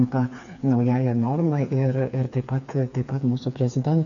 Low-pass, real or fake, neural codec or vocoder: 7.2 kHz; fake; codec, 16 kHz, 4 kbps, FreqCodec, smaller model